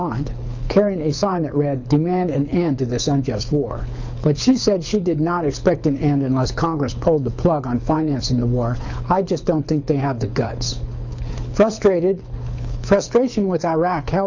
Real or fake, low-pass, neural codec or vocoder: fake; 7.2 kHz; codec, 24 kHz, 6 kbps, HILCodec